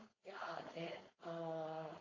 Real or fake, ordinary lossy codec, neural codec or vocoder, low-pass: fake; AAC, 32 kbps; codec, 16 kHz, 4.8 kbps, FACodec; 7.2 kHz